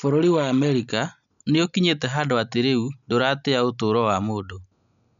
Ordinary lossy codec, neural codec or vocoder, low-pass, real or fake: none; none; 7.2 kHz; real